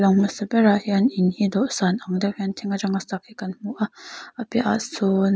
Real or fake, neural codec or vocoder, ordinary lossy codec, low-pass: real; none; none; none